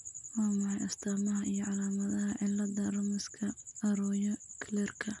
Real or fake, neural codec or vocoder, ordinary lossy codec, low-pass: real; none; none; 10.8 kHz